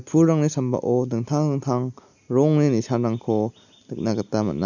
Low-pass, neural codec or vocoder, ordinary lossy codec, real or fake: 7.2 kHz; none; none; real